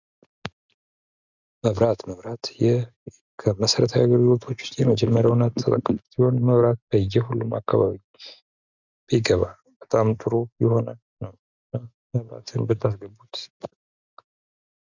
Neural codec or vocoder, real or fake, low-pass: none; real; 7.2 kHz